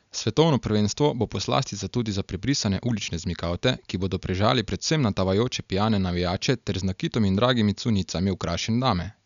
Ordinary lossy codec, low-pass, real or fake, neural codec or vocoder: none; 7.2 kHz; real; none